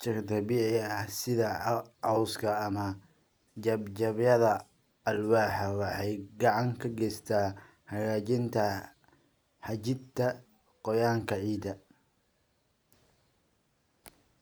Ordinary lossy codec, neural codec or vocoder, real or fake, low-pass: none; none; real; none